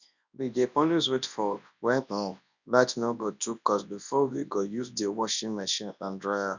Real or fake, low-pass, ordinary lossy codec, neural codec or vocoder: fake; 7.2 kHz; none; codec, 24 kHz, 0.9 kbps, WavTokenizer, large speech release